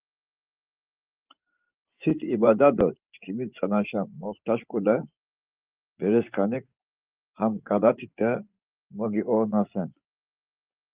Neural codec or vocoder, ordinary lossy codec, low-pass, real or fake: vocoder, 24 kHz, 100 mel bands, Vocos; Opus, 32 kbps; 3.6 kHz; fake